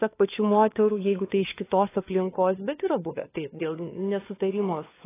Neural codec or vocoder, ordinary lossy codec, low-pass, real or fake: codec, 16 kHz, 4 kbps, FunCodec, trained on LibriTTS, 50 frames a second; AAC, 16 kbps; 3.6 kHz; fake